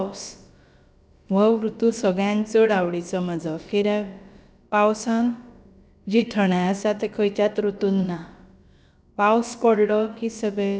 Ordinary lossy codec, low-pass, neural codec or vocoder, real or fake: none; none; codec, 16 kHz, about 1 kbps, DyCAST, with the encoder's durations; fake